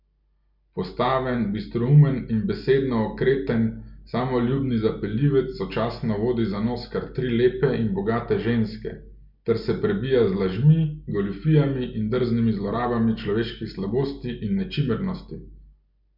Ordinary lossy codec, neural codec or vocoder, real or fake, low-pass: none; none; real; 5.4 kHz